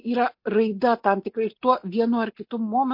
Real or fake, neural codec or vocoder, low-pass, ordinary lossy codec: real; none; 5.4 kHz; MP3, 32 kbps